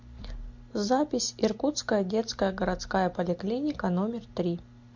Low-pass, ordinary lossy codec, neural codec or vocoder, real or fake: 7.2 kHz; MP3, 48 kbps; none; real